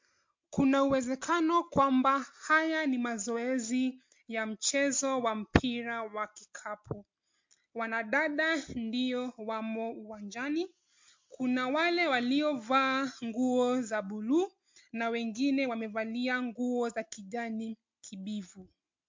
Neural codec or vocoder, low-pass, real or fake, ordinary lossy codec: none; 7.2 kHz; real; MP3, 48 kbps